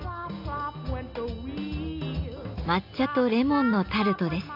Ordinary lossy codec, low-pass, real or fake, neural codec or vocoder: none; 5.4 kHz; real; none